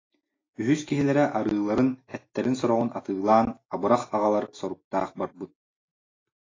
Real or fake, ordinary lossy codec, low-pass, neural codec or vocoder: real; AAC, 32 kbps; 7.2 kHz; none